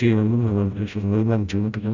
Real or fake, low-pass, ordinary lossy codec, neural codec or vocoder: fake; 7.2 kHz; none; codec, 16 kHz, 0.5 kbps, FreqCodec, smaller model